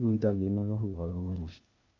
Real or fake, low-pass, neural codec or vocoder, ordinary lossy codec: fake; 7.2 kHz; codec, 16 kHz, 0.8 kbps, ZipCodec; MP3, 64 kbps